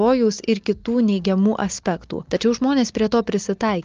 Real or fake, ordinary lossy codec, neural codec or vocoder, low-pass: real; Opus, 32 kbps; none; 7.2 kHz